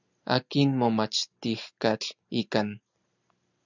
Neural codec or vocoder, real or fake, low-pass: none; real; 7.2 kHz